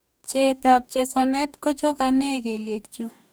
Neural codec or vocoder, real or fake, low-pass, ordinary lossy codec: codec, 44.1 kHz, 2.6 kbps, DAC; fake; none; none